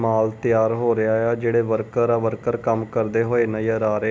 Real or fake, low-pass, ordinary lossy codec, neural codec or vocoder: real; none; none; none